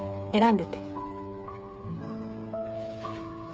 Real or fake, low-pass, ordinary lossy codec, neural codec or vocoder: fake; none; none; codec, 16 kHz, 8 kbps, FreqCodec, smaller model